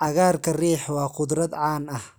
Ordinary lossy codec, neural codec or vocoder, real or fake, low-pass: none; none; real; none